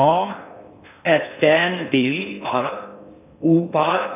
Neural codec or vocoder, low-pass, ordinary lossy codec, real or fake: codec, 16 kHz in and 24 kHz out, 0.6 kbps, FocalCodec, streaming, 4096 codes; 3.6 kHz; none; fake